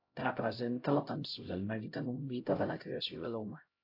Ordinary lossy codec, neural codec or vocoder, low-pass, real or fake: AAC, 32 kbps; codec, 16 kHz, 0.5 kbps, X-Codec, HuBERT features, trained on LibriSpeech; 5.4 kHz; fake